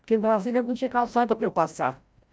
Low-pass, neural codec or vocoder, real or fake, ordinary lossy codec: none; codec, 16 kHz, 0.5 kbps, FreqCodec, larger model; fake; none